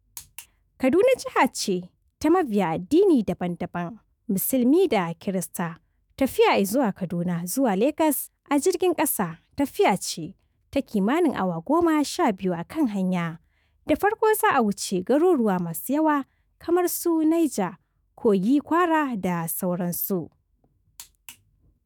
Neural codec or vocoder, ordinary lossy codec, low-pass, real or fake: autoencoder, 48 kHz, 128 numbers a frame, DAC-VAE, trained on Japanese speech; none; none; fake